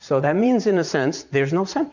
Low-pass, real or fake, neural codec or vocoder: 7.2 kHz; fake; vocoder, 44.1 kHz, 80 mel bands, Vocos